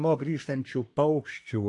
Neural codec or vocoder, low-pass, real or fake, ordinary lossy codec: codec, 24 kHz, 1 kbps, SNAC; 10.8 kHz; fake; AAC, 48 kbps